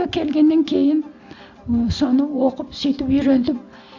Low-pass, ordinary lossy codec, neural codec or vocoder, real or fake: 7.2 kHz; none; none; real